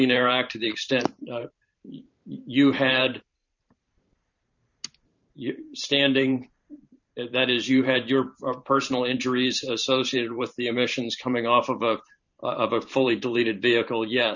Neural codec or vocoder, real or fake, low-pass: vocoder, 44.1 kHz, 128 mel bands every 512 samples, BigVGAN v2; fake; 7.2 kHz